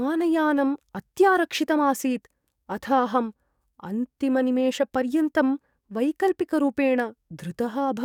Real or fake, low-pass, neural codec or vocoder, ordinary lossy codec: fake; 19.8 kHz; codec, 44.1 kHz, 7.8 kbps, DAC; none